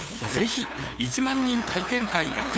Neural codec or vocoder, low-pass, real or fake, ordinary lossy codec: codec, 16 kHz, 2 kbps, FunCodec, trained on LibriTTS, 25 frames a second; none; fake; none